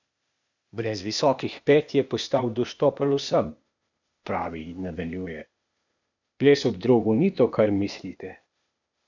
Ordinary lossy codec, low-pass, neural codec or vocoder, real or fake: none; 7.2 kHz; codec, 16 kHz, 0.8 kbps, ZipCodec; fake